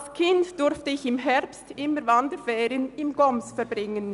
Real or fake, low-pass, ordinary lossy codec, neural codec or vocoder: real; 10.8 kHz; none; none